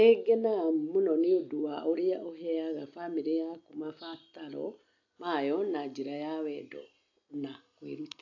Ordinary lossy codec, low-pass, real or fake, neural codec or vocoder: AAC, 48 kbps; 7.2 kHz; real; none